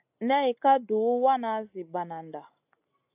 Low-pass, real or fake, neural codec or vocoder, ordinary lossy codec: 3.6 kHz; real; none; AAC, 32 kbps